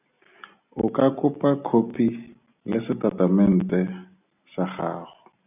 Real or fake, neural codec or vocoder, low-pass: real; none; 3.6 kHz